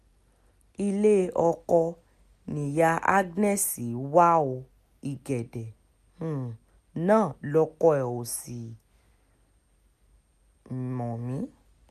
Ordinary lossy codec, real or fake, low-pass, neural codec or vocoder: none; real; 14.4 kHz; none